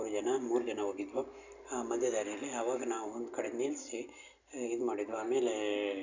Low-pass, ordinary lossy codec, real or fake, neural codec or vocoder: 7.2 kHz; none; real; none